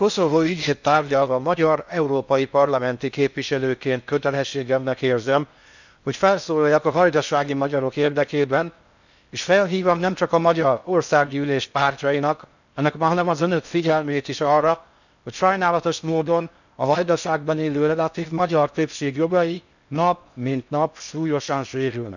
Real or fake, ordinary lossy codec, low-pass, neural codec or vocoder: fake; none; 7.2 kHz; codec, 16 kHz in and 24 kHz out, 0.6 kbps, FocalCodec, streaming, 4096 codes